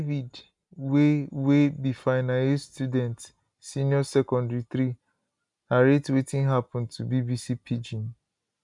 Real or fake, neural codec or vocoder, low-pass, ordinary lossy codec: real; none; 10.8 kHz; none